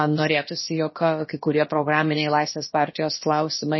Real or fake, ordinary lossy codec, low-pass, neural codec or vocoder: fake; MP3, 24 kbps; 7.2 kHz; codec, 16 kHz, about 1 kbps, DyCAST, with the encoder's durations